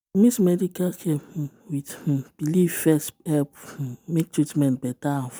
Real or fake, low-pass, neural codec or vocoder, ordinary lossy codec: real; none; none; none